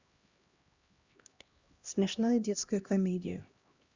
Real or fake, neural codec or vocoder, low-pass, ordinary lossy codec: fake; codec, 16 kHz, 1 kbps, X-Codec, HuBERT features, trained on LibriSpeech; 7.2 kHz; Opus, 64 kbps